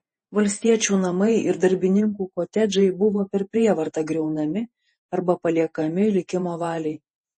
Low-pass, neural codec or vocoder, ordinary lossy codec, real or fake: 10.8 kHz; vocoder, 48 kHz, 128 mel bands, Vocos; MP3, 32 kbps; fake